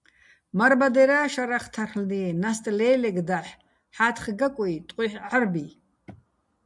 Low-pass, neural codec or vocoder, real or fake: 10.8 kHz; none; real